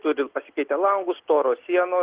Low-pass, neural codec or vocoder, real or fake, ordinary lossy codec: 3.6 kHz; none; real; Opus, 16 kbps